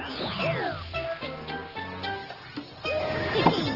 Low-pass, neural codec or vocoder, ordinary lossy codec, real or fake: 5.4 kHz; none; Opus, 16 kbps; real